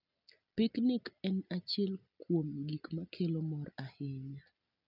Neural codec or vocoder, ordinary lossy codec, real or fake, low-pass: none; none; real; 5.4 kHz